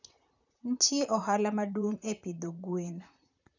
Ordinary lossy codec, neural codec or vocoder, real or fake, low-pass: none; vocoder, 44.1 kHz, 128 mel bands, Pupu-Vocoder; fake; 7.2 kHz